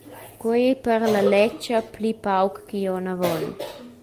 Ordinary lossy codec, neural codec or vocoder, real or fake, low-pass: Opus, 32 kbps; none; real; 14.4 kHz